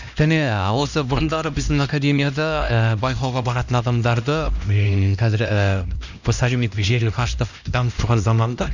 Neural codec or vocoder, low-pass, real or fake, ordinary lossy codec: codec, 16 kHz, 1 kbps, X-Codec, HuBERT features, trained on LibriSpeech; 7.2 kHz; fake; none